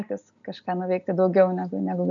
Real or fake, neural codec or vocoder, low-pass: real; none; 7.2 kHz